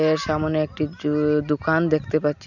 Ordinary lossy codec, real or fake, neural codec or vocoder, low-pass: none; real; none; 7.2 kHz